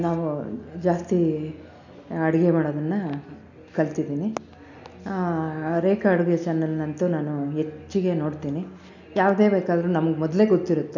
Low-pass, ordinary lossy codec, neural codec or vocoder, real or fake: 7.2 kHz; none; none; real